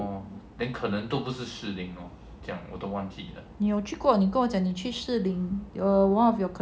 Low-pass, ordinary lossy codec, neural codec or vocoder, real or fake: none; none; none; real